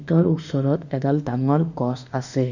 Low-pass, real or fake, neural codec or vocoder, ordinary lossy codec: 7.2 kHz; fake; autoencoder, 48 kHz, 32 numbers a frame, DAC-VAE, trained on Japanese speech; none